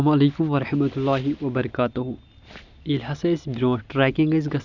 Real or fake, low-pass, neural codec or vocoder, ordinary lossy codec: real; 7.2 kHz; none; none